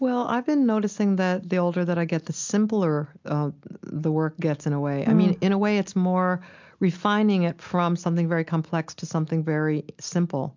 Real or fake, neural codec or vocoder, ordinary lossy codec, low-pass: real; none; MP3, 64 kbps; 7.2 kHz